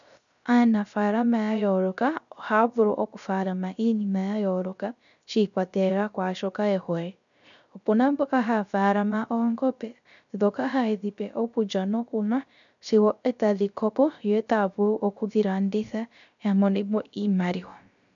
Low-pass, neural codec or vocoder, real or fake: 7.2 kHz; codec, 16 kHz, 0.3 kbps, FocalCodec; fake